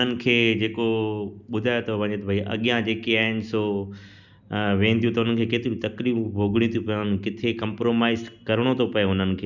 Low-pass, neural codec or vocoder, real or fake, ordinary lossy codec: 7.2 kHz; none; real; none